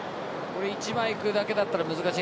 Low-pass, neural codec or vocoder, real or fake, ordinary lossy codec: none; none; real; none